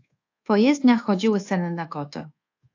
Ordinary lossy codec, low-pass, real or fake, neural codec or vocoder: AAC, 48 kbps; 7.2 kHz; fake; codec, 24 kHz, 1.2 kbps, DualCodec